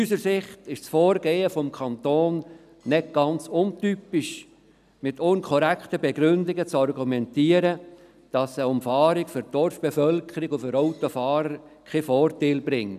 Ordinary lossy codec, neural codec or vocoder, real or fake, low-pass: none; none; real; 14.4 kHz